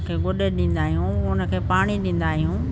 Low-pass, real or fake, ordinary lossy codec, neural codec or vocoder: none; real; none; none